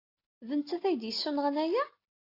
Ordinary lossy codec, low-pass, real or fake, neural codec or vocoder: AAC, 32 kbps; 5.4 kHz; real; none